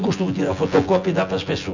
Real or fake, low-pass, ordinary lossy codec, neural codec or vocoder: fake; 7.2 kHz; none; vocoder, 24 kHz, 100 mel bands, Vocos